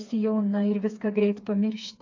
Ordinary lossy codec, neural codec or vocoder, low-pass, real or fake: AAC, 48 kbps; codec, 16 kHz, 4 kbps, FreqCodec, smaller model; 7.2 kHz; fake